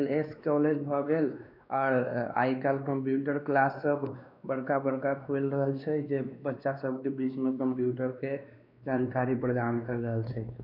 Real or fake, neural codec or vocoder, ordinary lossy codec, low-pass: fake; codec, 16 kHz, 2 kbps, X-Codec, WavLM features, trained on Multilingual LibriSpeech; none; 5.4 kHz